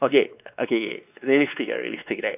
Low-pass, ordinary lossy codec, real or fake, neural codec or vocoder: 3.6 kHz; none; fake; codec, 16 kHz, 2 kbps, X-Codec, WavLM features, trained on Multilingual LibriSpeech